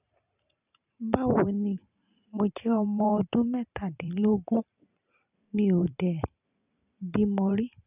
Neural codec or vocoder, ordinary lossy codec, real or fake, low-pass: vocoder, 44.1 kHz, 128 mel bands every 512 samples, BigVGAN v2; none; fake; 3.6 kHz